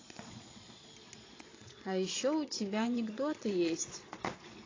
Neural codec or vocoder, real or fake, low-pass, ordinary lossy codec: vocoder, 22.05 kHz, 80 mel bands, Vocos; fake; 7.2 kHz; AAC, 32 kbps